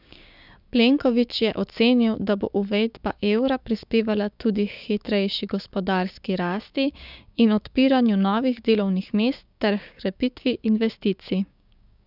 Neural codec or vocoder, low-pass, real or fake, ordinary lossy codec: codec, 16 kHz, 6 kbps, DAC; 5.4 kHz; fake; none